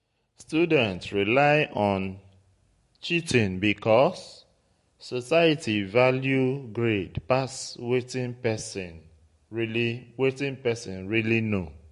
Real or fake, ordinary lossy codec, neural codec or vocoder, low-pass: real; MP3, 48 kbps; none; 14.4 kHz